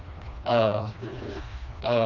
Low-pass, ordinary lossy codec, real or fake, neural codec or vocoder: 7.2 kHz; none; fake; codec, 16 kHz, 2 kbps, FreqCodec, smaller model